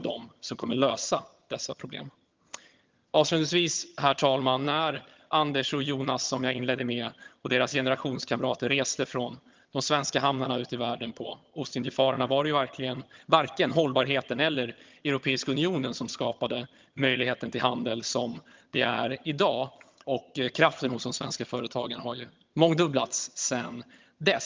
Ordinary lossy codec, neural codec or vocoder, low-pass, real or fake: Opus, 32 kbps; vocoder, 22.05 kHz, 80 mel bands, HiFi-GAN; 7.2 kHz; fake